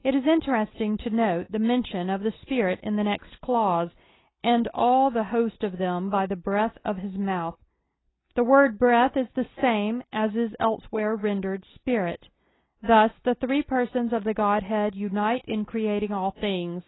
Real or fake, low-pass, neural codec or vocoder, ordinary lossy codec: real; 7.2 kHz; none; AAC, 16 kbps